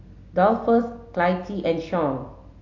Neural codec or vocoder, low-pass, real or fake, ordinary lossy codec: none; 7.2 kHz; real; AAC, 48 kbps